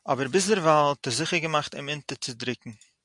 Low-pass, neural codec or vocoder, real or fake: 10.8 kHz; none; real